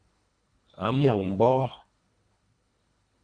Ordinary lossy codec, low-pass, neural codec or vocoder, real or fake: Opus, 64 kbps; 9.9 kHz; codec, 24 kHz, 1.5 kbps, HILCodec; fake